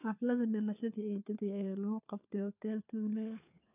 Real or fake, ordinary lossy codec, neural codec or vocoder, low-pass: fake; none; codec, 16 kHz, 4 kbps, FunCodec, trained on LibriTTS, 50 frames a second; 3.6 kHz